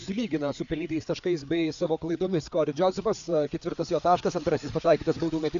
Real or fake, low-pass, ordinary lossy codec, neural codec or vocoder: fake; 7.2 kHz; AAC, 64 kbps; codec, 16 kHz, 4 kbps, FreqCodec, larger model